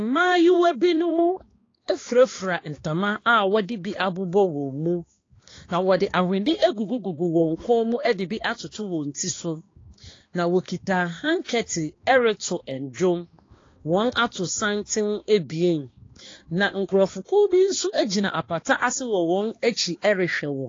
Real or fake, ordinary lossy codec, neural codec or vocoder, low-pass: fake; AAC, 32 kbps; codec, 16 kHz, 2 kbps, X-Codec, HuBERT features, trained on balanced general audio; 7.2 kHz